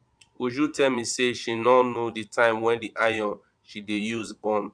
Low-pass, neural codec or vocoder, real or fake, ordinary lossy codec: 9.9 kHz; vocoder, 22.05 kHz, 80 mel bands, Vocos; fake; none